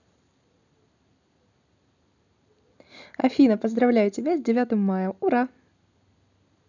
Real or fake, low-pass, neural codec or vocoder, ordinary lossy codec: real; 7.2 kHz; none; none